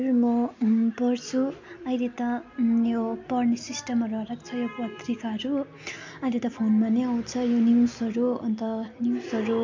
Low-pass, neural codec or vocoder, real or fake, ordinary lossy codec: 7.2 kHz; none; real; MP3, 48 kbps